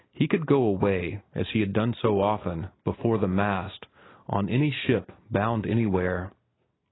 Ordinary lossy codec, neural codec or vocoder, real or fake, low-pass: AAC, 16 kbps; none; real; 7.2 kHz